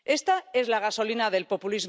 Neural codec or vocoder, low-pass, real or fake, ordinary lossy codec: none; none; real; none